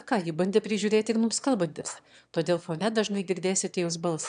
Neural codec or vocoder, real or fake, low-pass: autoencoder, 22.05 kHz, a latent of 192 numbers a frame, VITS, trained on one speaker; fake; 9.9 kHz